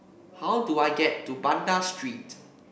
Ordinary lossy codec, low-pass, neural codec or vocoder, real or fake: none; none; none; real